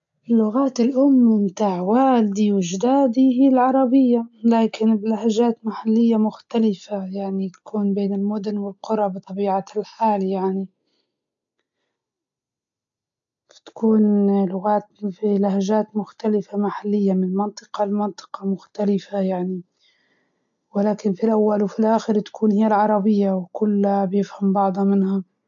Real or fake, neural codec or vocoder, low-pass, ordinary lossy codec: real; none; 7.2 kHz; AAC, 64 kbps